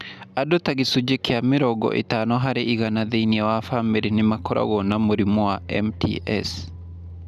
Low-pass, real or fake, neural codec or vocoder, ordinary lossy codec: 14.4 kHz; real; none; none